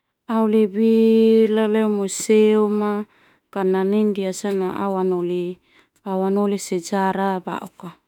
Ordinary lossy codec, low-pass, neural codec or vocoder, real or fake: none; 19.8 kHz; autoencoder, 48 kHz, 32 numbers a frame, DAC-VAE, trained on Japanese speech; fake